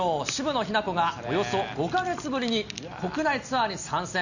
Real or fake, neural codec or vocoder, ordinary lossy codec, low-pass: real; none; none; 7.2 kHz